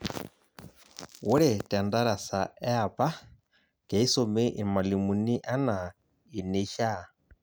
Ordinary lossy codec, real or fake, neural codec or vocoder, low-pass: none; real; none; none